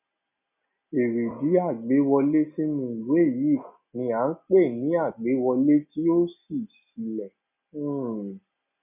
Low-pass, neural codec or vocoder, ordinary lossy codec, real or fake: 3.6 kHz; none; none; real